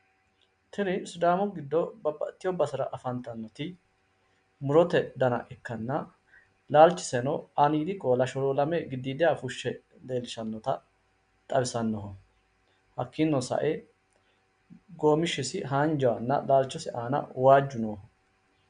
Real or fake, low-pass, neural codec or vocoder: real; 9.9 kHz; none